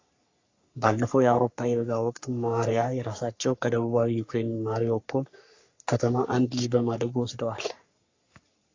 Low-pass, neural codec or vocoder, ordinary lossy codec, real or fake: 7.2 kHz; codec, 44.1 kHz, 3.4 kbps, Pupu-Codec; AAC, 48 kbps; fake